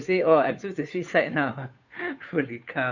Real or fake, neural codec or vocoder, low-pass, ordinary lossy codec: fake; codec, 16 kHz, 2 kbps, FunCodec, trained on Chinese and English, 25 frames a second; 7.2 kHz; none